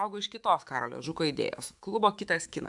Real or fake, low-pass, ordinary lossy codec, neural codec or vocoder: fake; 10.8 kHz; AAC, 64 kbps; autoencoder, 48 kHz, 128 numbers a frame, DAC-VAE, trained on Japanese speech